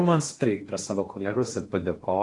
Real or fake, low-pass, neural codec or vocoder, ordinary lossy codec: fake; 10.8 kHz; codec, 16 kHz in and 24 kHz out, 0.8 kbps, FocalCodec, streaming, 65536 codes; AAC, 48 kbps